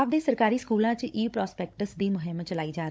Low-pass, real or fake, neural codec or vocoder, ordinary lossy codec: none; fake; codec, 16 kHz, 16 kbps, FunCodec, trained on Chinese and English, 50 frames a second; none